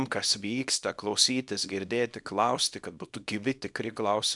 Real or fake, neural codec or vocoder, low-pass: fake; codec, 24 kHz, 0.9 kbps, WavTokenizer, small release; 10.8 kHz